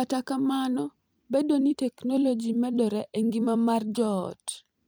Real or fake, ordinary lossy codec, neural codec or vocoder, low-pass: fake; none; vocoder, 44.1 kHz, 128 mel bands every 256 samples, BigVGAN v2; none